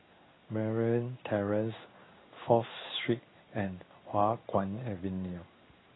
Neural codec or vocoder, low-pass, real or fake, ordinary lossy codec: codec, 16 kHz in and 24 kHz out, 1 kbps, XY-Tokenizer; 7.2 kHz; fake; AAC, 16 kbps